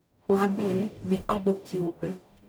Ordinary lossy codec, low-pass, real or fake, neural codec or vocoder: none; none; fake; codec, 44.1 kHz, 0.9 kbps, DAC